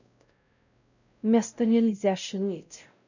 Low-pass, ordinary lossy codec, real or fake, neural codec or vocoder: 7.2 kHz; none; fake; codec, 16 kHz, 0.5 kbps, X-Codec, WavLM features, trained on Multilingual LibriSpeech